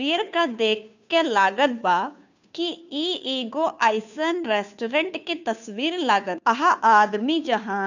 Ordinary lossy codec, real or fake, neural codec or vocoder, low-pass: none; fake; codec, 16 kHz, 2 kbps, FunCodec, trained on Chinese and English, 25 frames a second; 7.2 kHz